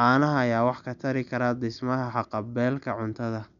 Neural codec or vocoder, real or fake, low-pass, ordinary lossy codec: none; real; 7.2 kHz; none